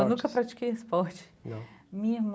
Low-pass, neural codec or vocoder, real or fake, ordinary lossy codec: none; none; real; none